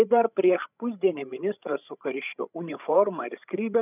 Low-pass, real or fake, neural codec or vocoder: 3.6 kHz; fake; codec, 16 kHz, 8 kbps, FreqCodec, larger model